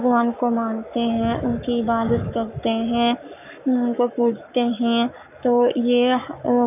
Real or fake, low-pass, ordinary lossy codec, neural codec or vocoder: fake; 3.6 kHz; none; codec, 44.1 kHz, 7.8 kbps, Pupu-Codec